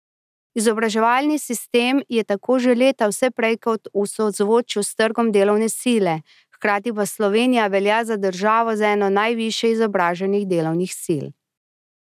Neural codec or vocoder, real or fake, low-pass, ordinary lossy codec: none; real; 14.4 kHz; none